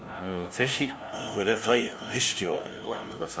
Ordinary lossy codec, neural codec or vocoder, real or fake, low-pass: none; codec, 16 kHz, 0.5 kbps, FunCodec, trained on LibriTTS, 25 frames a second; fake; none